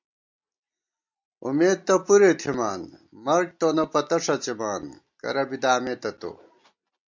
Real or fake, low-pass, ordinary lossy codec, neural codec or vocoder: real; 7.2 kHz; MP3, 64 kbps; none